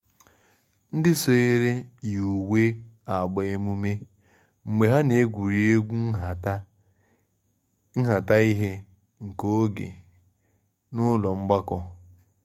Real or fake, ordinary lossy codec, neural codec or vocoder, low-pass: fake; MP3, 64 kbps; codec, 44.1 kHz, 7.8 kbps, DAC; 19.8 kHz